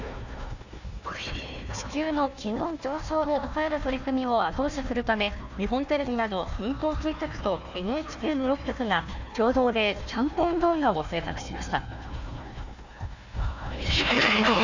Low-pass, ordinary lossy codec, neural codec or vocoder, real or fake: 7.2 kHz; none; codec, 16 kHz, 1 kbps, FunCodec, trained on Chinese and English, 50 frames a second; fake